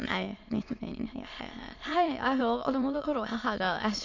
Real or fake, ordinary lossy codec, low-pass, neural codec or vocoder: fake; AAC, 48 kbps; 7.2 kHz; autoencoder, 22.05 kHz, a latent of 192 numbers a frame, VITS, trained on many speakers